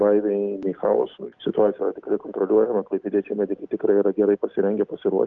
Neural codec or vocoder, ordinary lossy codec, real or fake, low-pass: none; Opus, 24 kbps; real; 7.2 kHz